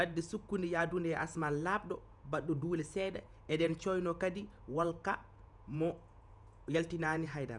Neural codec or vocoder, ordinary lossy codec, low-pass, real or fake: vocoder, 48 kHz, 128 mel bands, Vocos; none; 10.8 kHz; fake